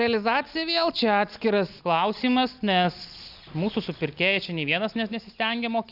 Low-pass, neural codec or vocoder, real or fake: 5.4 kHz; none; real